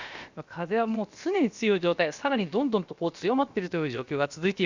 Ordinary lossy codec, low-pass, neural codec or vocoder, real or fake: Opus, 64 kbps; 7.2 kHz; codec, 16 kHz, about 1 kbps, DyCAST, with the encoder's durations; fake